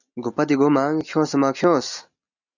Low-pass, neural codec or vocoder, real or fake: 7.2 kHz; none; real